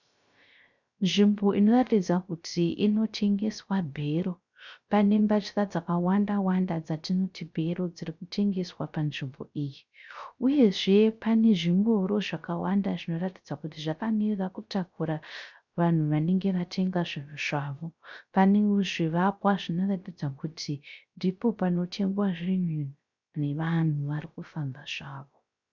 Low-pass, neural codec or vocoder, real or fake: 7.2 kHz; codec, 16 kHz, 0.3 kbps, FocalCodec; fake